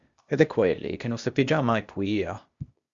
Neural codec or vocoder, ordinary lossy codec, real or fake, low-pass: codec, 16 kHz, 0.8 kbps, ZipCodec; Opus, 64 kbps; fake; 7.2 kHz